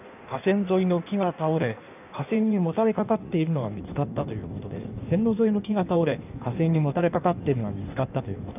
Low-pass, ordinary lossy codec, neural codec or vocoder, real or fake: 3.6 kHz; none; codec, 16 kHz in and 24 kHz out, 1.1 kbps, FireRedTTS-2 codec; fake